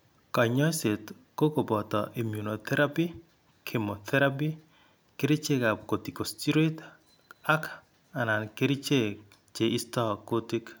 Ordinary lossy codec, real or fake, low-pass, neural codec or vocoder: none; real; none; none